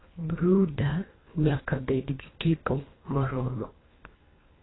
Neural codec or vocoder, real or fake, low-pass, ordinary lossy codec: codec, 24 kHz, 1.5 kbps, HILCodec; fake; 7.2 kHz; AAC, 16 kbps